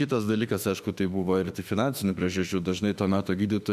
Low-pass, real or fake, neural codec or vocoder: 14.4 kHz; fake; autoencoder, 48 kHz, 32 numbers a frame, DAC-VAE, trained on Japanese speech